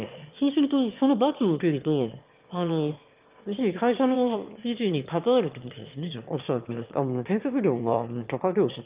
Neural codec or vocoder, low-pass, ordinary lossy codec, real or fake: autoencoder, 22.05 kHz, a latent of 192 numbers a frame, VITS, trained on one speaker; 3.6 kHz; Opus, 24 kbps; fake